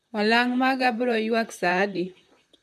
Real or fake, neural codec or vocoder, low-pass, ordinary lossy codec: fake; vocoder, 44.1 kHz, 128 mel bands, Pupu-Vocoder; 14.4 kHz; MP3, 64 kbps